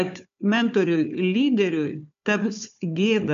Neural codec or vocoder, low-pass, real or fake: codec, 16 kHz, 4 kbps, FunCodec, trained on Chinese and English, 50 frames a second; 7.2 kHz; fake